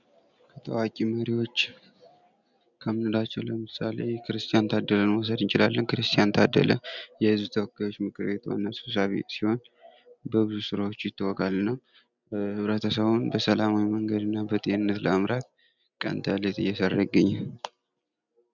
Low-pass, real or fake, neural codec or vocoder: 7.2 kHz; real; none